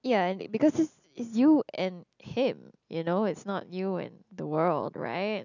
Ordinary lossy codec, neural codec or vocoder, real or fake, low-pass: none; none; real; 7.2 kHz